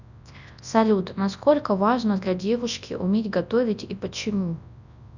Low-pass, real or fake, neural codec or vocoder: 7.2 kHz; fake; codec, 24 kHz, 0.9 kbps, WavTokenizer, large speech release